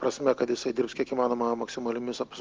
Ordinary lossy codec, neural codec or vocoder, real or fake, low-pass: Opus, 32 kbps; none; real; 7.2 kHz